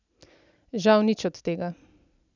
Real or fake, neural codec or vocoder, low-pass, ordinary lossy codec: real; none; 7.2 kHz; none